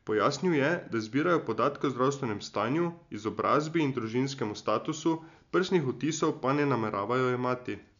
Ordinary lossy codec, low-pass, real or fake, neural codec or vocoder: none; 7.2 kHz; real; none